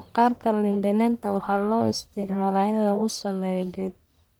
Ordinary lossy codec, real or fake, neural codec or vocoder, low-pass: none; fake; codec, 44.1 kHz, 1.7 kbps, Pupu-Codec; none